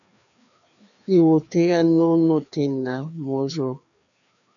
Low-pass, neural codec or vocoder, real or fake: 7.2 kHz; codec, 16 kHz, 2 kbps, FreqCodec, larger model; fake